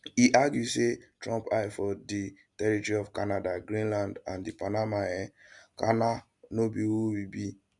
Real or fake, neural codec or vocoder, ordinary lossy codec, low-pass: real; none; none; 10.8 kHz